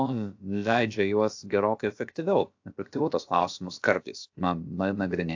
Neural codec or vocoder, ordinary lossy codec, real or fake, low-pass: codec, 16 kHz, about 1 kbps, DyCAST, with the encoder's durations; AAC, 48 kbps; fake; 7.2 kHz